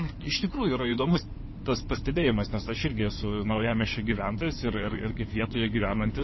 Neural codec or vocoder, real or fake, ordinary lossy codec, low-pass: codec, 16 kHz in and 24 kHz out, 2.2 kbps, FireRedTTS-2 codec; fake; MP3, 24 kbps; 7.2 kHz